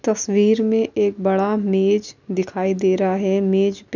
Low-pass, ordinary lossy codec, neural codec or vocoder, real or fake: 7.2 kHz; none; none; real